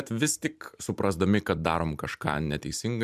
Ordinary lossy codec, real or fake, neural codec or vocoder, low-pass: MP3, 96 kbps; fake; vocoder, 44.1 kHz, 128 mel bands every 256 samples, BigVGAN v2; 14.4 kHz